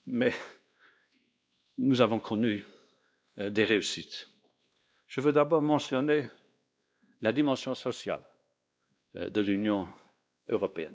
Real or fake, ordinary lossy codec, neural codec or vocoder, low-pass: fake; none; codec, 16 kHz, 2 kbps, X-Codec, WavLM features, trained on Multilingual LibriSpeech; none